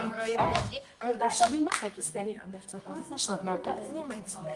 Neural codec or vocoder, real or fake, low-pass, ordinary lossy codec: codec, 24 kHz, 0.9 kbps, WavTokenizer, medium music audio release; fake; 10.8 kHz; Opus, 32 kbps